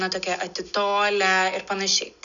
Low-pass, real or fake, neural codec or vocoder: 7.2 kHz; real; none